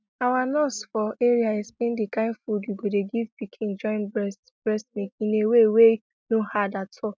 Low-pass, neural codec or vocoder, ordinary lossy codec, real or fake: none; none; none; real